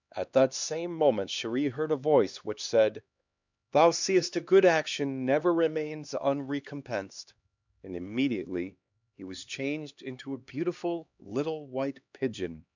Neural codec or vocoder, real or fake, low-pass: codec, 16 kHz, 2 kbps, X-Codec, HuBERT features, trained on LibriSpeech; fake; 7.2 kHz